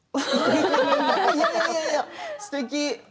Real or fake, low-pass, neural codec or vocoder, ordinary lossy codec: real; none; none; none